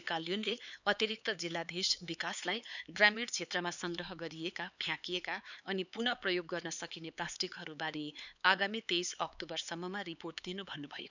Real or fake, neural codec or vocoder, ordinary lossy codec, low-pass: fake; codec, 16 kHz, 4 kbps, X-Codec, HuBERT features, trained on LibriSpeech; none; 7.2 kHz